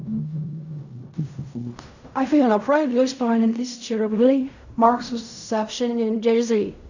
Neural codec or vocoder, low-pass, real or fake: codec, 16 kHz in and 24 kHz out, 0.4 kbps, LongCat-Audio-Codec, fine tuned four codebook decoder; 7.2 kHz; fake